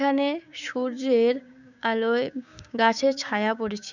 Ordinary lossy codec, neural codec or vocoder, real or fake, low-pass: none; codec, 16 kHz, 6 kbps, DAC; fake; 7.2 kHz